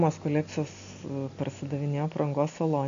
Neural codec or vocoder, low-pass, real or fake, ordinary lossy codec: none; 7.2 kHz; real; MP3, 64 kbps